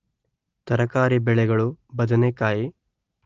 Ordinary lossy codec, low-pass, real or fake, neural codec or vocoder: Opus, 16 kbps; 7.2 kHz; real; none